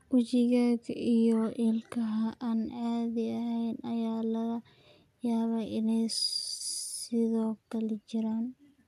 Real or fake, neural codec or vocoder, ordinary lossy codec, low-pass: real; none; none; 14.4 kHz